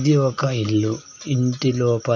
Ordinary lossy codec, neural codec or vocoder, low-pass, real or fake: none; none; 7.2 kHz; real